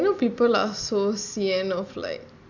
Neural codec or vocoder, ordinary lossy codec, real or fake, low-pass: none; none; real; 7.2 kHz